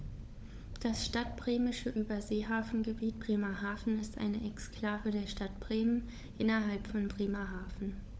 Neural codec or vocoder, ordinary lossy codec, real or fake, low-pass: codec, 16 kHz, 16 kbps, FunCodec, trained on LibriTTS, 50 frames a second; none; fake; none